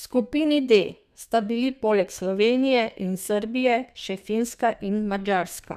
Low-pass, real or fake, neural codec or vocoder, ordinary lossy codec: 14.4 kHz; fake; codec, 32 kHz, 1.9 kbps, SNAC; none